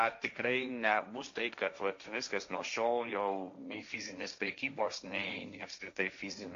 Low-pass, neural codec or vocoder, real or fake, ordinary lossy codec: 7.2 kHz; codec, 16 kHz, 1.1 kbps, Voila-Tokenizer; fake; MP3, 48 kbps